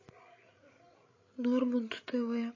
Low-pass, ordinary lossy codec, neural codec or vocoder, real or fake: 7.2 kHz; MP3, 32 kbps; codec, 16 kHz, 16 kbps, FreqCodec, larger model; fake